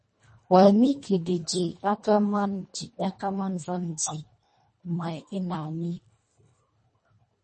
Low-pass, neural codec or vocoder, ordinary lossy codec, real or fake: 10.8 kHz; codec, 24 kHz, 1.5 kbps, HILCodec; MP3, 32 kbps; fake